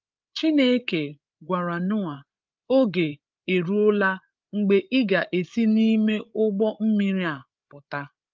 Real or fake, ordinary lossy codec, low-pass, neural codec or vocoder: fake; Opus, 24 kbps; 7.2 kHz; codec, 16 kHz, 8 kbps, FreqCodec, larger model